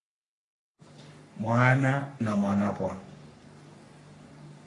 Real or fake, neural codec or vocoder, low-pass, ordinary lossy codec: fake; codec, 44.1 kHz, 3.4 kbps, Pupu-Codec; 10.8 kHz; AAC, 48 kbps